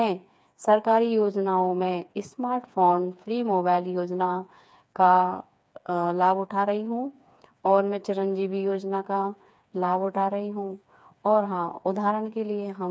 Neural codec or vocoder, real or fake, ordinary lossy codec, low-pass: codec, 16 kHz, 4 kbps, FreqCodec, smaller model; fake; none; none